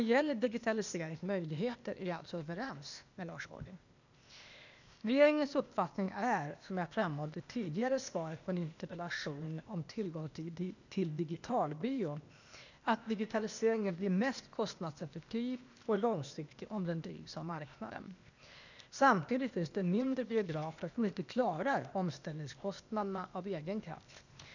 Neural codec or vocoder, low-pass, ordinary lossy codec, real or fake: codec, 16 kHz, 0.8 kbps, ZipCodec; 7.2 kHz; none; fake